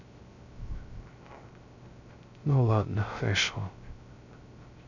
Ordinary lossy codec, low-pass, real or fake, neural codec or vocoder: none; 7.2 kHz; fake; codec, 16 kHz, 0.3 kbps, FocalCodec